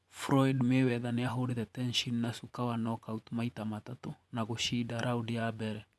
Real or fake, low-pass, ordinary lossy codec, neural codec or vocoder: fake; none; none; vocoder, 24 kHz, 100 mel bands, Vocos